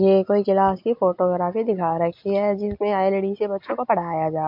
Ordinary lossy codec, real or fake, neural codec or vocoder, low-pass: MP3, 48 kbps; real; none; 5.4 kHz